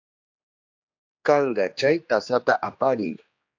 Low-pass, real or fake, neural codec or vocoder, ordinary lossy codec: 7.2 kHz; fake; codec, 16 kHz, 2 kbps, X-Codec, HuBERT features, trained on general audio; AAC, 48 kbps